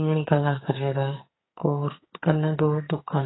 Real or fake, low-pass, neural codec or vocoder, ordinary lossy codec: fake; 7.2 kHz; codec, 44.1 kHz, 2.6 kbps, SNAC; AAC, 16 kbps